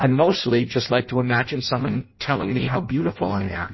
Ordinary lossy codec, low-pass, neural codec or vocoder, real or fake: MP3, 24 kbps; 7.2 kHz; codec, 16 kHz in and 24 kHz out, 0.6 kbps, FireRedTTS-2 codec; fake